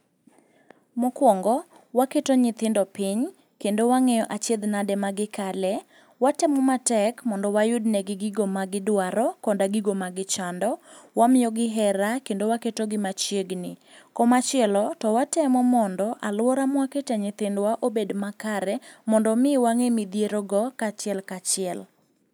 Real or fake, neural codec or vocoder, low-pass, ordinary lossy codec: real; none; none; none